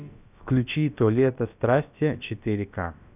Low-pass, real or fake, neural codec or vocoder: 3.6 kHz; fake; codec, 16 kHz, about 1 kbps, DyCAST, with the encoder's durations